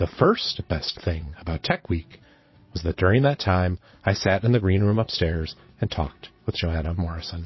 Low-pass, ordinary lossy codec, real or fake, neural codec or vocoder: 7.2 kHz; MP3, 24 kbps; fake; vocoder, 22.05 kHz, 80 mel bands, Vocos